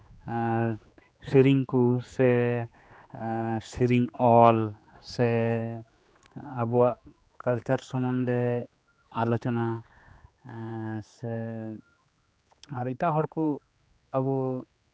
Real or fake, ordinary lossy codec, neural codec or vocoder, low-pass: fake; none; codec, 16 kHz, 2 kbps, X-Codec, HuBERT features, trained on general audio; none